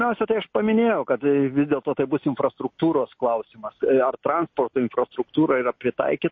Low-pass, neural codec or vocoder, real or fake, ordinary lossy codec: 7.2 kHz; none; real; MP3, 32 kbps